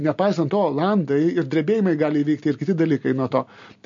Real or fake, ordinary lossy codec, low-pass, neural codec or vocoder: real; MP3, 48 kbps; 7.2 kHz; none